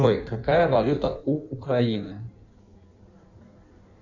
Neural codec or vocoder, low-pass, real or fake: codec, 16 kHz in and 24 kHz out, 1.1 kbps, FireRedTTS-2 codec; 7.2 kHz; fake